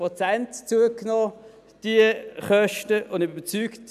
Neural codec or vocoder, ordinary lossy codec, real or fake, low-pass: none; none; real; 14.4 kHz